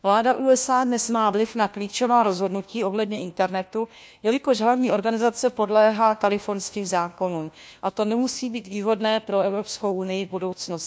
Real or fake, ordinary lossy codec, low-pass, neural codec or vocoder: fake; none; none; codec, 16 kHz, 1 kbps, FunCodec, trained on LibriTTS, 50 frames a second